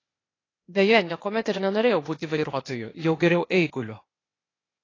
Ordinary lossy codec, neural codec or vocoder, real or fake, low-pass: AAC, 32 kbps; codec, 16 kHz, 0.8 kbps, ZipCodec; fake; 7.2 kHz